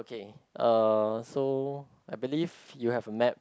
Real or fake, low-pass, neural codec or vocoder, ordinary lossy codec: real; none; none; none